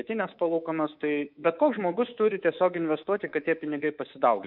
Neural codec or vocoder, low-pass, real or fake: codec, 24 kHz, 3.1 kbps, DualCodec; 5.4 kHz; fake